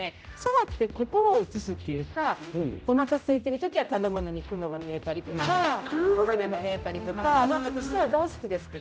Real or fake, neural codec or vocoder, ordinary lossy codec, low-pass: fake; codec, 16 kHz, 0.5 kbps, X-Codec, HuBERT features, trained on general audio; none; none